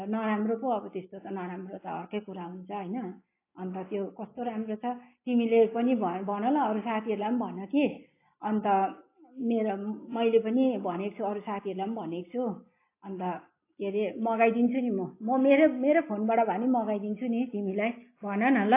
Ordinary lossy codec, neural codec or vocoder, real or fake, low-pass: AAC, 24 kbps; none; real; 3.6 kHz